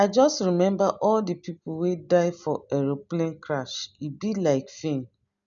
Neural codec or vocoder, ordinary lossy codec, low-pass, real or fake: none; none; 7.2 kHz; real